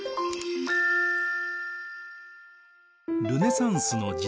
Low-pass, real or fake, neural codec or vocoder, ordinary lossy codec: none; real; none; none